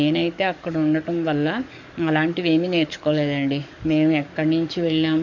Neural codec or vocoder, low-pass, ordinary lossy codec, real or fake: codec, 44.1 kHz, 7.8 kbps, DAC; 7.2 kHz; none; fake